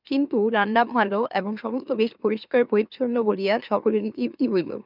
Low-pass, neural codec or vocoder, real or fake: 5.4 kHz; autoencoder, 44.1 kHz, a latent of 192 numbers a frame, MeloTTS; fake